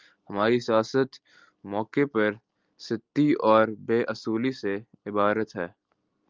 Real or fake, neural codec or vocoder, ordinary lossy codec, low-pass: real; none; Opus, 24 kbps; 7.2 kHz